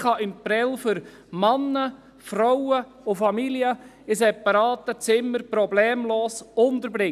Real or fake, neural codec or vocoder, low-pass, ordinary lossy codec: real; none; 14.4 kHz; none